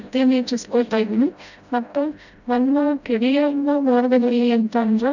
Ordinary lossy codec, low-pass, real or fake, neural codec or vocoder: none; 7.2 kHz; fake; codec, 16 kHz, 0.5 kbps, FreqCodec, smaller model